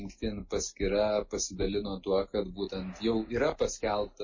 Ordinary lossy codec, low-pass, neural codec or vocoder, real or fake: MP3, 32 kbps; 7.2 kHz; none; real